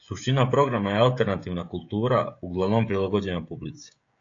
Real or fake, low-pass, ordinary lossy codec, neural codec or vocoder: fake; 7.2 kHz; AAC, 48 kbps; codec, 16 kHz, 16 kbps, FreqCodec, smaller model